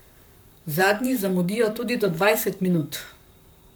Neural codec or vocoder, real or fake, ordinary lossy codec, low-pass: codec, 44.1 kHz, 7.8 kbps, Pupu-Codec; fake; none; none